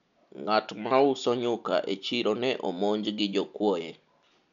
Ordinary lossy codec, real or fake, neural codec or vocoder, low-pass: none; real; none; 7.2 kHz